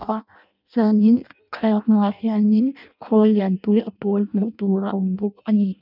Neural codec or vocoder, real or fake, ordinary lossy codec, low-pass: codec, 16 kHz in and 24 kHz out, 0.6 kbps, FireRedTTS-2 codec; fake; none; 5.4 kHz